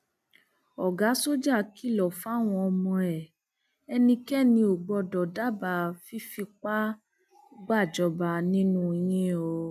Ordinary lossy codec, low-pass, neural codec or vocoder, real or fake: none; 14.4 kHz; none; real